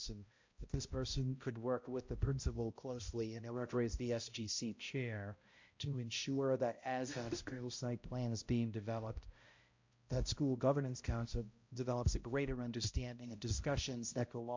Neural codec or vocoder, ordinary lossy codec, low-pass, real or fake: codec, 16 kHz, 0.5 kbps, X-Codec, HuBERT features, trained on balanced general audio; MP3, 48 kbps; 7.2 kHz; fake